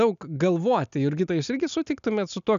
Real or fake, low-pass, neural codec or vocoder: real; 7.2 kHz; none